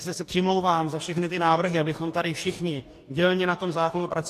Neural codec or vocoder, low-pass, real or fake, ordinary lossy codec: codec, 44.1 kHz, 2.6 kbps, DAC; 14.4 kHz; fake; AAC, 64 kbps